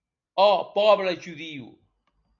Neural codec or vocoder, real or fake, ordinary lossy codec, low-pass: none; real; MP3, 64 kbps; 7.2 kHz